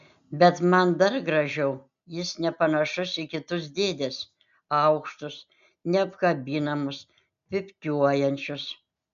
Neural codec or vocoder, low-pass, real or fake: none; 7.2 kHz; real